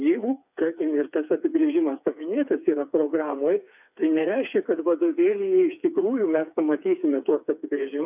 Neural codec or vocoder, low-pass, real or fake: codec, 16 kHz, 4 kbps, FreqCodec, smaller model; 3.6 kHz; fake